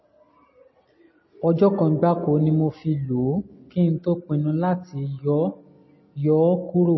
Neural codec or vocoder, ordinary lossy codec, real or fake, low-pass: none; MP3, 24 kbps; real; 7.2 kHz